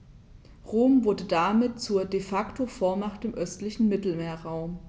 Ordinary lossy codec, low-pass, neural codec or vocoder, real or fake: none; none; none; real